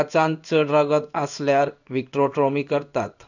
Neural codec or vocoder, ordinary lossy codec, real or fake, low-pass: codec, 16 kHz in and 24 kHz out, 1 kbps, XY-Tokenizer; none; fake; 7.2 kHz